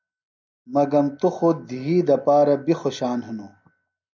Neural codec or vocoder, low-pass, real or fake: none; 7.2 kHz; real